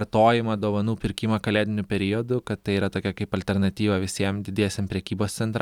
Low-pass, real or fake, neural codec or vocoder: 19.8 kHz; real; none